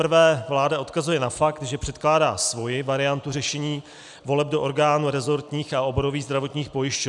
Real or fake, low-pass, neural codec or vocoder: real; 10.8 kHz; none